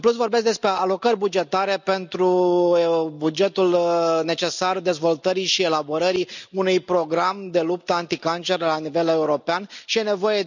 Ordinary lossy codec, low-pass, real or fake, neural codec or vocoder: none; 7.2 kHz; real; none